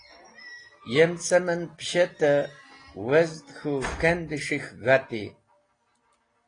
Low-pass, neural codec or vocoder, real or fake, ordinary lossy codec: 9.9 kHz; none; real; AAC, 32 kbps